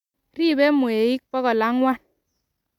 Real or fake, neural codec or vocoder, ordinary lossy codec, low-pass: real; none; none; 19.8 kHz